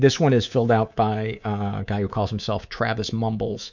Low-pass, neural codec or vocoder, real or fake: 7.2 kHz; codec, 24 kHz, 3.1 kbps, DualCodec; fake